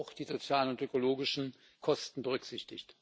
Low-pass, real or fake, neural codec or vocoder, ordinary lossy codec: none; real; none; none